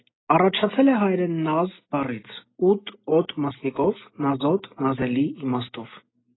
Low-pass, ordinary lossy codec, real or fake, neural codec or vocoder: 7.2 kHz; AAC, 16 kbps; real; none